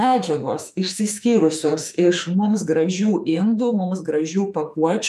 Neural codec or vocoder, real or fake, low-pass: autoencoder, 48 kHz, 32 numbers a frame, DAC-VAE, trained on Japanese speech; fake; 14.4 kHz